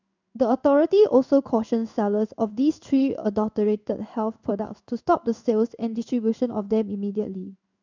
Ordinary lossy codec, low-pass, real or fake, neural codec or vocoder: none; 7.2 kHz; fake; codec, 16 kHz in and 24 kHz out, 1 kbps, XY-Tokenizer